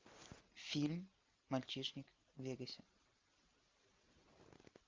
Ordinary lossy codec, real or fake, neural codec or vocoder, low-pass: Opus, 32 kbps; real; none; 7.2 kHz